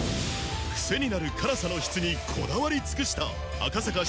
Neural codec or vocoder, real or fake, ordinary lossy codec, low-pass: none; real; none; none